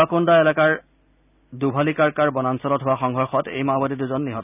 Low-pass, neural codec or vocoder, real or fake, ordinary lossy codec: 3.6 kHz; none; real; none